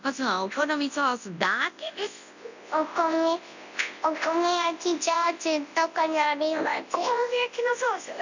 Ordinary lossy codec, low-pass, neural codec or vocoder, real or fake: none; 7.2 kHz; codec, 24 kHz, 0.9 kbps, WavTokenizer, large speech release; fake